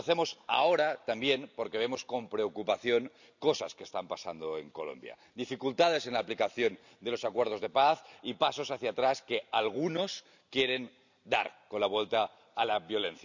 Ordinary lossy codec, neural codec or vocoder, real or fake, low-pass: none; none; real; 7.2 kHz